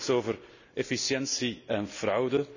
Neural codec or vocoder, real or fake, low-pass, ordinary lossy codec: none; real; 7.2 kHz; none